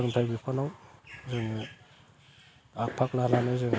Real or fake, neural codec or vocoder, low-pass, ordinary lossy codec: real; none; none; none